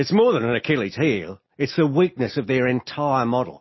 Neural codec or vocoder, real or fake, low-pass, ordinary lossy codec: none; real; 7.2 kHz; MP3, 24 kbps